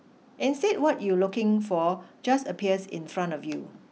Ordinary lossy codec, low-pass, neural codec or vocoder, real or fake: none; none; none; real